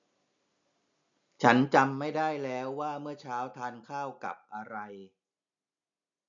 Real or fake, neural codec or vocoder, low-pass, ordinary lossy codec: real; none; 7.2 kHz; none